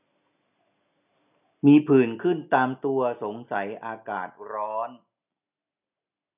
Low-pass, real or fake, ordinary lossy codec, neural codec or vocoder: 3.6 kHz; real; none; none